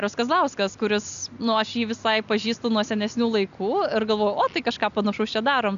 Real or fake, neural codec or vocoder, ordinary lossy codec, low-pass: real; none; AAC, 96 kbps; 7.2 kHz